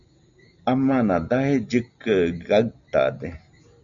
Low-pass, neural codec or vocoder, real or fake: 7.2 kHz; none; real